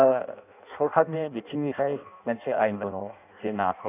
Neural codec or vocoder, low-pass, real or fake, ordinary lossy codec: codec, 16 kHz in and 24 kHz out, 0.6 kbps, FireRedTTS-2 codec; 3.6 kHz; fake; none